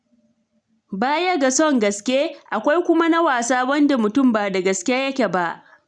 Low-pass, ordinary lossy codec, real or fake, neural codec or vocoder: 9.9 kHz; none; real; none